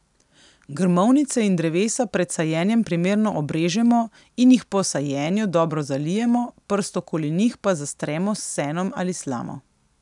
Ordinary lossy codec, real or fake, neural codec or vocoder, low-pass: none; real; none; 10.8 kHz